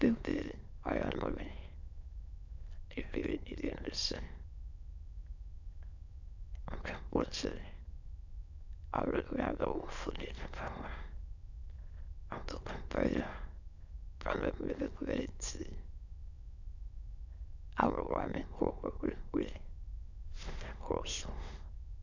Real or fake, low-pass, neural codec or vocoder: fake; 7.2 kHz; autoencoder, 22.05 kHz, a latent of 192 numbers a frame, VITS, trained on many speakers